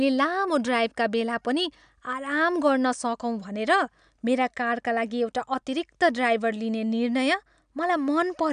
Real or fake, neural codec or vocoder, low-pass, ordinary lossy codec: real; none; 9.9 kHz; none